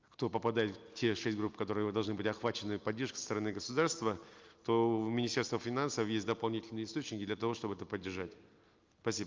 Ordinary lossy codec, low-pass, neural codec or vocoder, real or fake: Opus, 24 kbps; 7.2 kHz; none; real